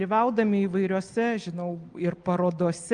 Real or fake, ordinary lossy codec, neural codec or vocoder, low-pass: real; Opus, 24 kbps; none; 9.9 kHz